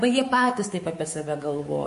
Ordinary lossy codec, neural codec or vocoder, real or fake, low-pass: MP3, 48 kbps; codec, 24 kHz, 3.1 kbps, DualCodec; fake; 10.8 kHz